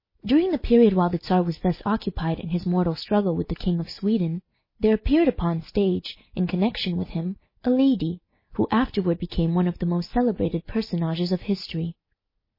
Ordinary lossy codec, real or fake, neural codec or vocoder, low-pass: MP3, 24 kbps; real; none; 5.4 kHz